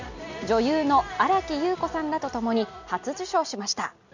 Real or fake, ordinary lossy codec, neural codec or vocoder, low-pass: real; none; none; 7.2 kHz